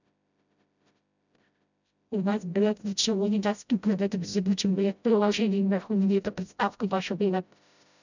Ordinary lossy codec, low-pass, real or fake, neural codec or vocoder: none; 7.2 kHz; fake; codec, 16 kHz, 0.5 kbps, FreqCodec, smaller model